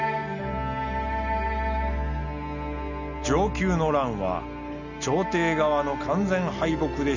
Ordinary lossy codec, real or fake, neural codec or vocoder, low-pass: none; real; none; 7.2 kHz